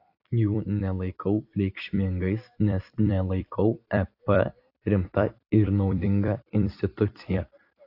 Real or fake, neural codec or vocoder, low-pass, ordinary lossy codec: fake; vocoder, 44.1 kHz, 128 mel bands every 256 samples, BigVGAN v2; 5.4 kHz; AAC, 32 kbps